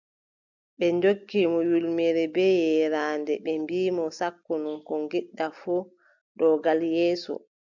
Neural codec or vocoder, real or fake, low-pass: none; real; 7.2 kHz